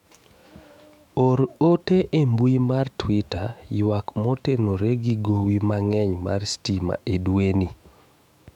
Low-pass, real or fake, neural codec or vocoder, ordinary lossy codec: 19.8 kHz; fake; autoencoder, 48 kHz, 128 numbers a frame, DAC-VAE, trained on Japanese speech; MP3, 96 kbps